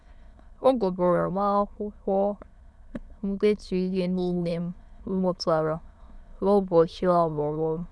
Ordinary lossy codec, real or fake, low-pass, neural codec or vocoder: none; fake; none; autoencoder, 22.05 kHz, a latent of 192 numbers a frame, VITS, trained on many speakers